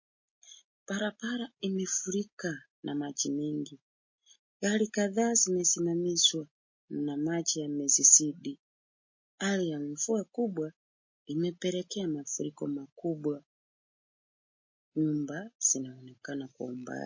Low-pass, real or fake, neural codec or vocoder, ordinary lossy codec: 7.2 kHz; real; none; MP3, 32 kbps